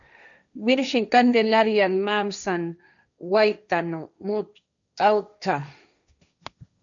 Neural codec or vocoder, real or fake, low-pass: codec, 16 kHz, 1.1 kbps, Voila-Tokenizer; fake; 7.2 kHz